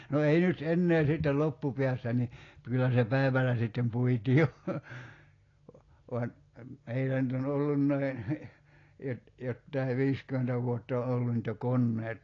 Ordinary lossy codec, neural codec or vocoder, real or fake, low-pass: AAC, 32 kbps; none; real; 7.2 kHz